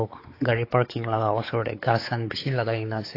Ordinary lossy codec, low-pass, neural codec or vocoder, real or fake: AAC, 32 kbps; 5.4 kHz; codec, 16 kHz, 4 kbps, X-Codec, WavLM features, trained on Multilingual LibriSpeech; fake